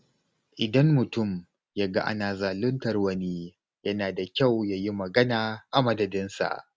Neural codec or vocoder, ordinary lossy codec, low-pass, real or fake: none; none; none; real